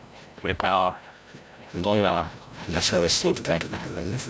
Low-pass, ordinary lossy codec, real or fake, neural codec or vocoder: none; none; fake; codec, 16 kHz, 0.5 kbps, FreqCodec, larger model